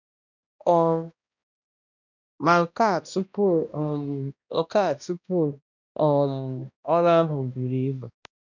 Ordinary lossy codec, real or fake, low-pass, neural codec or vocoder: none; fake; 7.2 kHz; codec, 16 kHz, 1 kbps, X-Codec, HuBERT features, trained on balanced general audio